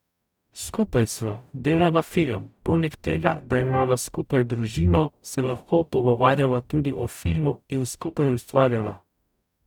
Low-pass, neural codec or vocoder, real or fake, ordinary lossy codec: 19.8 kHz; codec, 44.1 kHz, 0.9 kbps, DAC; fake; none